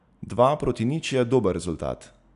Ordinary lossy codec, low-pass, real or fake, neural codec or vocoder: none; 10.8 kHz; real; none